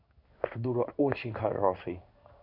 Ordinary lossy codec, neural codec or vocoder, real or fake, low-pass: MP3, 48 kbps; codec, 16 kHz in and 24 kHz out, 1 kbps, XY-Tokenizer; fake; 5.4 kHz